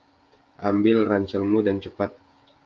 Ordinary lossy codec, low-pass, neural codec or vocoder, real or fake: Opus, 32 kbps; 7.2 kHz; codec, 16 kHz, 16 kbps, FreqCodec, smaller model; fake